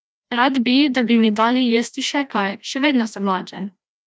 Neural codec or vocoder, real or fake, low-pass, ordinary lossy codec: codec, 16 kHz, 1 kbps, FreqCodec, larger model; fake; none; none